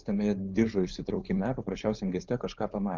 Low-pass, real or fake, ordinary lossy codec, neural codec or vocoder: 7.2 kHz; fake; Opus, 24 kbps; codec, 16 kHz, 4.8 kbps, FACodec